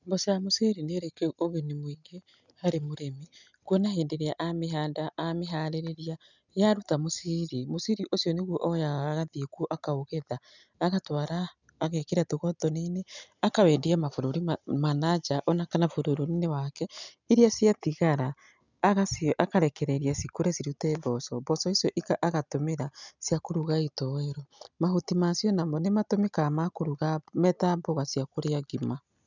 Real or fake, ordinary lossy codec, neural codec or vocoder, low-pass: real; none; none; 7.2 kHz